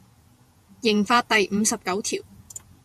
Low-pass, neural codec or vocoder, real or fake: 14.4 kHz; vocoder, 48 kHz, 128 mel bands, Vocos; fake